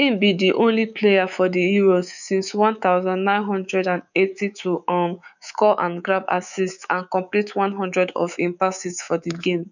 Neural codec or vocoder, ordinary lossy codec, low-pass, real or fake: codec, 24 kHz, 3.1 kbps, DualCodec; none; 7.2 kHz; fake